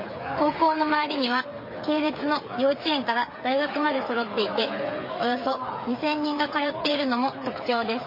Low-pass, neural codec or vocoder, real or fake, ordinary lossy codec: 5.4 kHz; codec, 16 kHz, 8 kbps, FreqCodec, smaller model; fake; MP3, 24 kbps